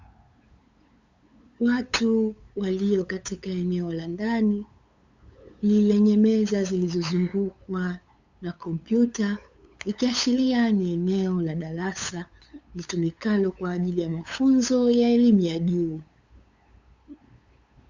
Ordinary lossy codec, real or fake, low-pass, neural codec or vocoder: Opus, 64 kbps; fake; 7.2 kHz; codec, 16 kHz, 8 kbps, FunCodec, trained on LibriTTS, 25 frames a second